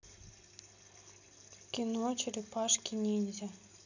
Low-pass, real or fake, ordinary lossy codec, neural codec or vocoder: 7.2 kHz; real; none; none